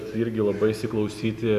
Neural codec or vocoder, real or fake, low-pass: none; real; 14.4 kHz